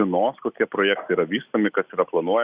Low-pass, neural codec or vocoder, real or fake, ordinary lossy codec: 3.6 kHz; none; real; Opus, 64 kbps